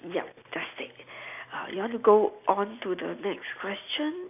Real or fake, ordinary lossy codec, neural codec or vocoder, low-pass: real; none; none; 3.6 kHz